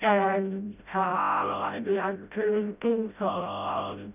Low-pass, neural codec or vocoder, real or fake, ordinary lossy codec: 3.6 kHz; codec, 16 kHz, 0.5 kbps, FreqCodec, smaller model; fake; none